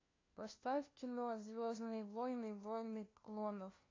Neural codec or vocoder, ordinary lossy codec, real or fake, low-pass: codec, 16 kHz, 1 kbps, FunCodec, trained on LibriTTS, 50 frames a second; AAC, 32 kbps; fake; 7.2 kHz